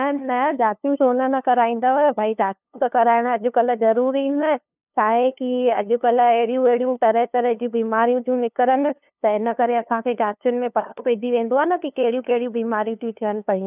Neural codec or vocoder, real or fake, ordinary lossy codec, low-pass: codec, 16 kHz, 2 kbps, FunCodec, trained on LibriTTS, 25 frames a second; fake; none; 3.6 kHz